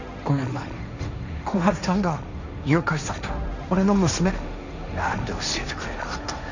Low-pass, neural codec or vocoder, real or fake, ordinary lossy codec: 7.2 kHz; codec, 16 kHz, 1.1 kbps, Voila-Tokenizer; fake; none